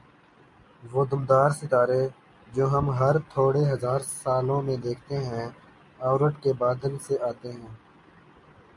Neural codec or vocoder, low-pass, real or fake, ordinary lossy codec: none; 10.8 kHz; real; MP3, 64 kbps